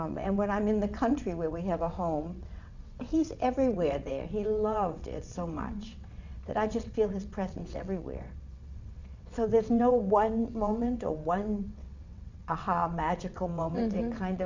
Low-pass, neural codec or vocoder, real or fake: 7.2 kHz; vocoder, 22.05 kHz, 80 mel bands, WaveNeXt; fake